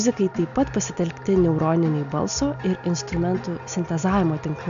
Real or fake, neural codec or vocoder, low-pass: real; none; 7.2 kHz